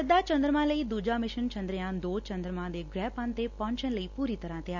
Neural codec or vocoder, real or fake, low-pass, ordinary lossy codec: none; real; 7.2 kHz; none